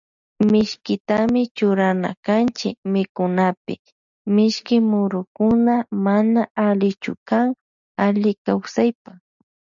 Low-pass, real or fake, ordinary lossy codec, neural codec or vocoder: 7.2 kHz; real; MP3, 96 kbps; none